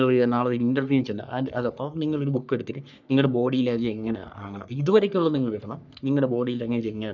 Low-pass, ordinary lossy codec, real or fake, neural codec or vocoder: 7.2 kHz; none; fake; codec, 44.1 kHz, 3.4 kbps, Pupu-Codec